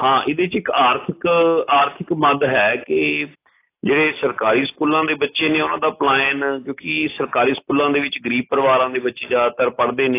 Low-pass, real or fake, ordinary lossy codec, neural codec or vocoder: 3.6 kHz; real; AAC, 24 kbps; none